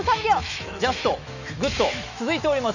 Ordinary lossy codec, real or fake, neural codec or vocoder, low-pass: none; fake; autoencoder, 48 kHz, 128 numbers a frame, DAC-VAE, trained on Japanese speech; 7.2 kHz